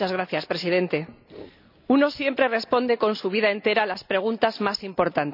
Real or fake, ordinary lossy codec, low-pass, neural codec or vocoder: real; none; 5.4 kHz; none